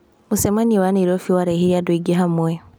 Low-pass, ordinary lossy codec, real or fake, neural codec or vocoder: none; none; real; none